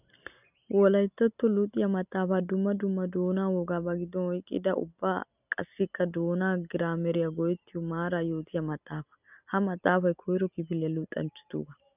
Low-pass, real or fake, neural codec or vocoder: 3.6 kHz; real; none